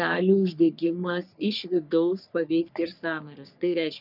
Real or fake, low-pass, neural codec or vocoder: fake; 5.4 kHz; codec, 44.1 kHz, 3.4 kbps, Pupu-Codec